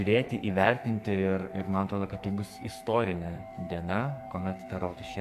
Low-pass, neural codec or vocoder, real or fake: 14.4 kHz; codec, 44.1 kHz, 2.6 kbps, SNAC; fake